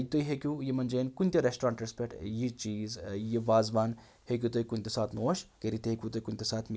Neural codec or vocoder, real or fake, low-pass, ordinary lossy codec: none; real; none; none